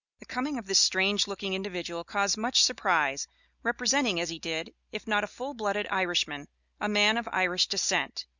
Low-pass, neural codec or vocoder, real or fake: 7.2 kHz; none; real